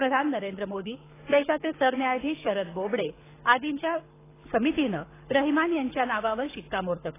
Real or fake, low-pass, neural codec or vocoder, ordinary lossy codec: fake; 3.6 kHz; codec, 24 kHz, 6 kbps, HILCodec; AAC, 16 kbps